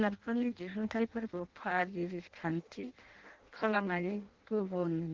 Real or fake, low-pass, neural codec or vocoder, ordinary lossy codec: fake; 7.2 kHz; codec, 16 kHz in and 24 kHz out, 0.6 kbps, FireRedTTS-2 codec; Opus, 16 kbps